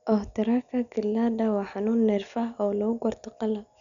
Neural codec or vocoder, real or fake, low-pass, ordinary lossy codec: none; real; 7.2 kHz; none